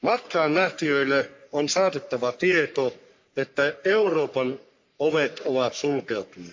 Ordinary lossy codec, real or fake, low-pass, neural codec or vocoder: MP3, 48 kbps; fake; 7.2 kHz; codec, 44.1 kHz, 3.4 kbps, Pupu-Codec